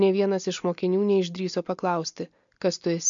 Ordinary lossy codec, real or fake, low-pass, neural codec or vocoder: MP3, 64 kbps; real; 7.2 kHz; none